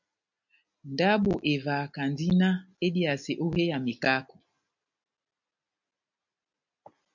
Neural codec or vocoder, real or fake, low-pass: none; real; 7.2 kHz